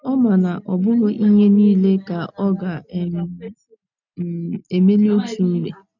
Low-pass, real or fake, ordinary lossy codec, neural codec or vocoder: 7.2 kHz; real; none; none